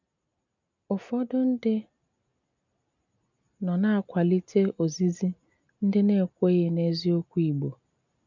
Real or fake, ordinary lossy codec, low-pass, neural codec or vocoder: real; none; 7.2 kHz; none